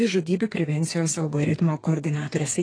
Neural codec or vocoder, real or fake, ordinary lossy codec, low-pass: codec, 32 kHz, 1.9 kbps, SNAC; fake; AAC, 32 kbps; 9.9 kHz